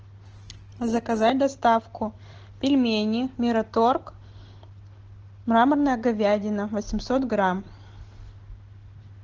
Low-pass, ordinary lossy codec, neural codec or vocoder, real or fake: 7.2 kHz; Opus, 16 kbps; none; real